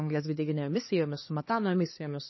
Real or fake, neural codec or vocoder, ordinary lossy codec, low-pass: fake; codec, 16 kHz, 2 kbps, X-Codec, HuBERT features, trained on LibriSpeech; MP3, 24 kbps; 7.2 kHz